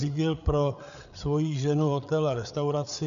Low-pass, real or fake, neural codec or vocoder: 7.2 kHz; fake; codec, 16 kHz, 8 kbps, FreqCodec, larger model